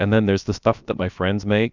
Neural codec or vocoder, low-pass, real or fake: codec, 16 kHz, about 1 kbps, DyCAST, with the encoder's durations; 7.2 kHz; fake